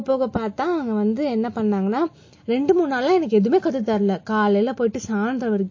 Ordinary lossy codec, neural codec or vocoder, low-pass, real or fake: MP3, 32 kbps; none; 7.2 kHz; real